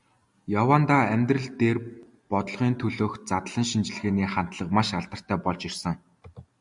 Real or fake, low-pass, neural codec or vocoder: real; 10.8 kHz; none